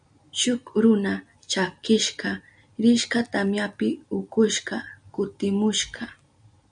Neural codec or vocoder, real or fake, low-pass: none; real; 9.9 kHz